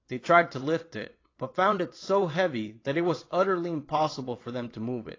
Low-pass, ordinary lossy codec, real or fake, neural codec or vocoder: 7.2 kHz; AAC, 32 kbps; fake; vocoder, 22.05 kHz, 80 mel bands, WaveNeXt